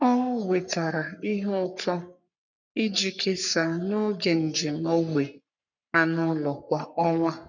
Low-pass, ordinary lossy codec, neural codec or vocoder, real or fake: 7.2 kHz; none; codec, 44.1 kHz, 3.4 kbps, Pupu-Codec; fake